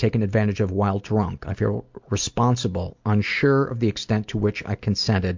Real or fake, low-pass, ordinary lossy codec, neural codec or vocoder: real; 7.2 kHz; MP3, 64 kbps; none